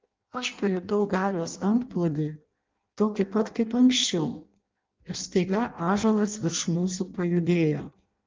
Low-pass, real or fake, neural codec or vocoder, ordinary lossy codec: 7.2 kHz; fake; codec, 16 kHz in and 24 kHz out, 0.6 kbps, FireRedTTS-2 codec; Opus, 32 kbps